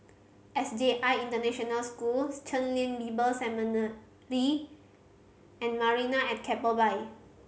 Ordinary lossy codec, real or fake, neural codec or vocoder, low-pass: none; real; none; none